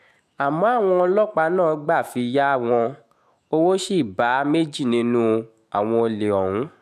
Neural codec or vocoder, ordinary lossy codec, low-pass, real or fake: autoencoder, 48 kHz, 128 numbers a frame, DAC-VAE, trained on Japanese speech; none; 14.4 kHz; fake